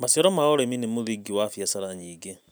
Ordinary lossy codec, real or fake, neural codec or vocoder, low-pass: none; real; none; none